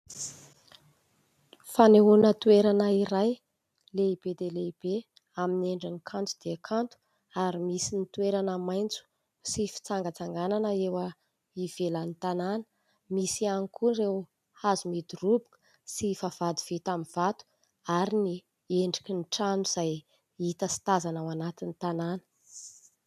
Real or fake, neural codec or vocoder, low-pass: real; none; 14.4 kHz